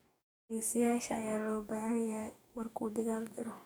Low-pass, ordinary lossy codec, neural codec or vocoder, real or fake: none; none; codec, 44.1 kHz, 2.6 kbps, DAC; fake